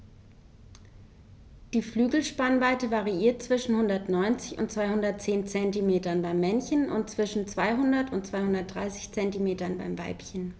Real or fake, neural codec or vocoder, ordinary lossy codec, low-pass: real; none; none; none